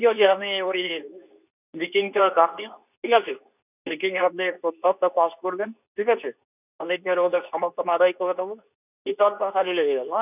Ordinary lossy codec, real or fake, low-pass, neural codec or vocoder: none; fake; 3.6 kHz; codec, 24 kHz, 0.9 kbps, WavTokenizer, medium speech release version 2